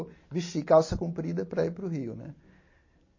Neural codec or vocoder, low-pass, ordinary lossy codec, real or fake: none; 7.2 kHz; MP3, 32 kbps; real